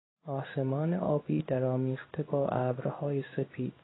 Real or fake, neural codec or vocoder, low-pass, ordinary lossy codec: fake; codec, 16 kHz in and 24 kHz out, 1 kbps, XY-Tokenizer; 7.2 kHz; AAC, 16 kbps